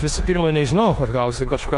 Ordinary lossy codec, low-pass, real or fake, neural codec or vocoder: AAC, 64 kbps; 10.8 kHz; fake; codec, 16 kHz in and 24 kHz out, 0.9 kbps, LongCat-Audio-Codec, four codebook decoder